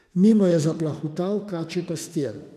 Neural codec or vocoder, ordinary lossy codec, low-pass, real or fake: autoencoder, 48 kHz, 32 numbers a frame, DAC-VAE, trained on Japanese speech; none; 14.4 kHz; fake